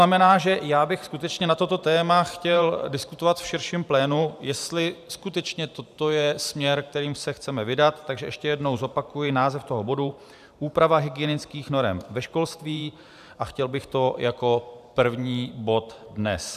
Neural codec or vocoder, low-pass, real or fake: vocoder, 44.1 kHz, 128 mel bands every 512 samples, BigVGAN v2; 14.4 kHz; fake